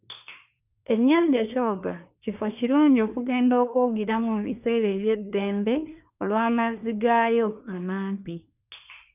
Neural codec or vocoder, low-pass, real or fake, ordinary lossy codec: codec, 24 kHz, 1 kbps, SNAC; 3.6 kHz; fake; none